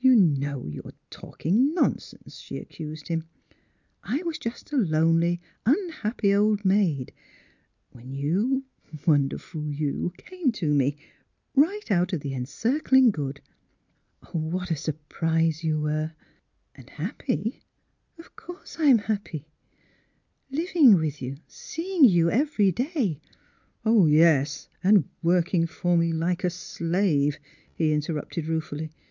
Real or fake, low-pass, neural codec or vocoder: real; 7.2 kHz; none